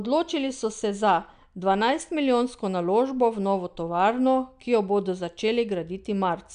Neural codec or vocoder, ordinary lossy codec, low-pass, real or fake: none; MP3, 96 kbps; 9.9 kHz; real